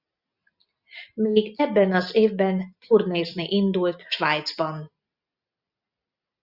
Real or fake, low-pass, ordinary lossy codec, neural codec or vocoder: real; 5.4 kHz; Opus, 64 kbps; none